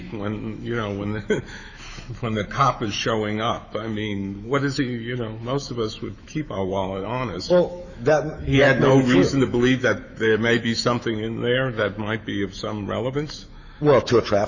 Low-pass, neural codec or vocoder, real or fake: 7.2 kHz; autoencoder, 48 kHz, 128 numbers a frame, DAC-VAE, trained on Japanese speech; fake